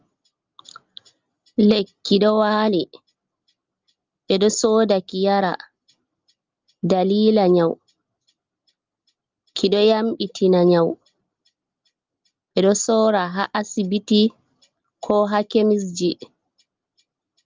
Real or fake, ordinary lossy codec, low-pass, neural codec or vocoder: real; Opus, 32 kbps; 7.2 kHz; none